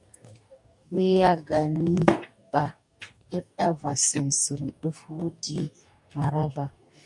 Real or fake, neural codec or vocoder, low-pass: fake; codec, 44.1 kHz, 2.6 kbps, DAC; 10.8 kHz